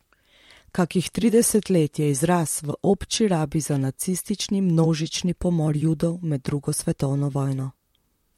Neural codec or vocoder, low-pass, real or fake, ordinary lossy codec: vocoder, 44.1 kHz, 128 mel bands, Pupu-Vocoder; 19.8 kHz; fake; MP3, 64 kbps